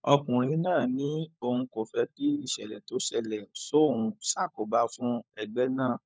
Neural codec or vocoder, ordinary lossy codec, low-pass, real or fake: codec, 16 kHz, 16 kbps, FunCodec, trained on LibriTTS, 50 frames a second; none; none; fake